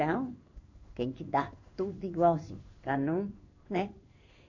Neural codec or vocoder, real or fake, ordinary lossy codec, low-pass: codec, 16 kHz in and 24 kHz out, 1 kbps, XY-Tokenizer; fake; none; 7.2 kHz